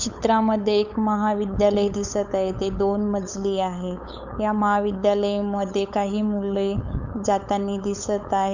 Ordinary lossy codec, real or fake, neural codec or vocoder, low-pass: none; fake; codec, 16 kHz, 8 kbps, FunCodec, trained on LibriTTS, 25 frames a second; 7.2 kHz